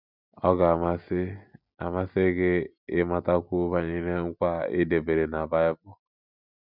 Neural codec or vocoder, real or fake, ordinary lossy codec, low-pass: none; real; none; 5.4 kHz